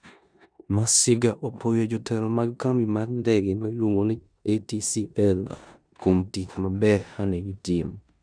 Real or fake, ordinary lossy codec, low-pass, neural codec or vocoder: fake; none; 9.9 kHz; codec, 16 kHz in and 24 kHz out, 0.9 kbps, LongCat-Audio-Codec, four codebook decoder